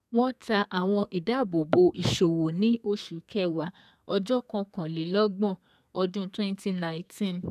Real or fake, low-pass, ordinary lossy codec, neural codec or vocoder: fake; 14.4 kHz; none; codec, 32 kHz, 1.9 kbps, SNAC